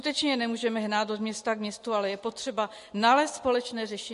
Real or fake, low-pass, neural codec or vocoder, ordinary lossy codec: real; 14.4 kHz; none; MP3, 48 kbps